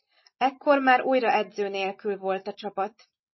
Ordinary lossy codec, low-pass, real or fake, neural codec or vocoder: MP3, 24 kbps; 7.2 kHz; real; none